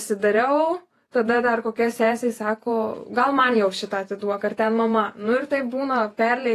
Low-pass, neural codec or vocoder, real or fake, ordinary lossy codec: 14.4 kHz; vocoder, 48 kHz, 128 mel bands, Vocos; fake; AAC, 48 kbps